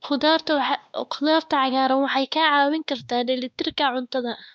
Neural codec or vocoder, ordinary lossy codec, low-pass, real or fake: codec, 16 kHz, 2 kbps, X-Codec, WavLM features, trained on Multilingual LibriSpeech; none; none; fake